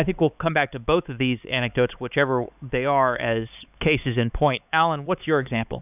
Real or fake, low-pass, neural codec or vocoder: fake; 3.6 kHz; codec, 16 kHz, 4 kbps, X-Codec, HuBERT features, trained on LibriSpeech